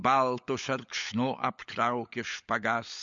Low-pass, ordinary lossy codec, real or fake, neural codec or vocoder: 7.2 kHz; MP3, 48 kbps; fake; codec, 16 kHz, 16 kbps, FunCodec, trained on LibriTTS, 50 frames a second